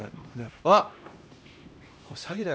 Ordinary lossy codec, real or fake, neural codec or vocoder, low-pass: none; fake; codec, 16 kHz, 1 kbps, X-Codec, HuBERT features, trained on LibriSpeech; none